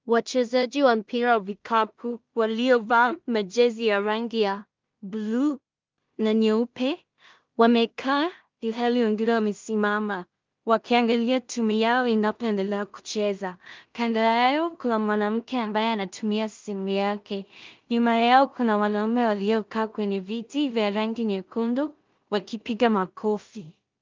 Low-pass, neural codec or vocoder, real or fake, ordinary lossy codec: 7.2 kHz; codec, 16 kHz in and 24 kHz out, 0.4 kbps, LongCat-Audio-Codec, two codebook decoder; fake; Opus, 24 kbps